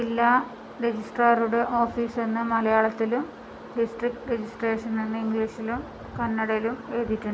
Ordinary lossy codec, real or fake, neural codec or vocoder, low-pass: none; real; none; none